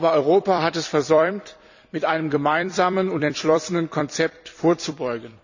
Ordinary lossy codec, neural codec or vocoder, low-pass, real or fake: none; vocoder, 44.1 kHz, 128 mel bands every 256 samples, BigVGAN v2; 7.2 kHz; fake